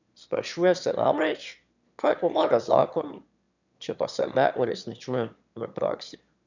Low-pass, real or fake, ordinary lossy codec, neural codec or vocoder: 7.2 kHz; fake; none; autoencoder, 22.05 kHz, a latent of 192 numbers a frame, VITS, trained on one speaker